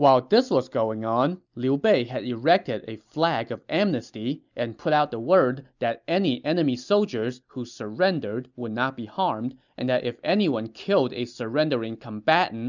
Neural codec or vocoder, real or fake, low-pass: none; real; 7.2 kHz